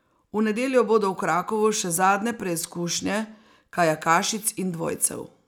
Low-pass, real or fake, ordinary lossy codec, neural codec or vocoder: 19.8 kHz; real; none; none